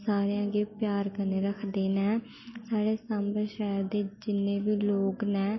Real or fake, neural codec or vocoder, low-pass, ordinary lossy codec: real; none; 7.2 kHz; MP3, 24 kbps